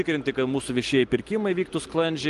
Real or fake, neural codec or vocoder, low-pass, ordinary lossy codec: real; none; 10.8 kHz; Opus, 24 kbps